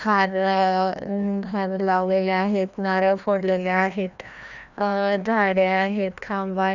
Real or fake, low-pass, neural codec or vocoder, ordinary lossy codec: fake; 7.2 kHz; codec, 16 kHz, 1 kbps, FreqCodec, larger model; none